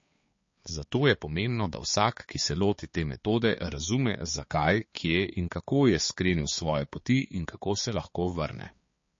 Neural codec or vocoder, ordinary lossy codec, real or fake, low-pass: codec, 16 kHz, 4 kbps, X-Codec, HuBERT features, trained on balanced general audio; MP3, 32 kbps; fake; 7.2 kHz